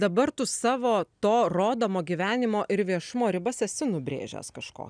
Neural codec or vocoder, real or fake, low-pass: none; real; 9.9 kHz